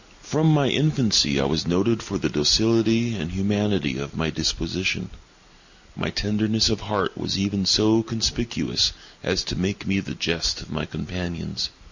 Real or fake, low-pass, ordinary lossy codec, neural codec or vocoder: real; 7.2 kHz; Opus, 64 kbps; none